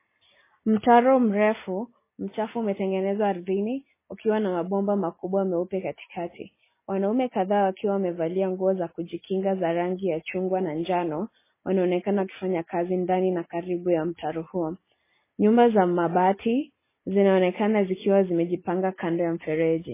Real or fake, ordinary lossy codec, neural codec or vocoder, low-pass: real; MP3, 16 kbps; none; 3.6 kHz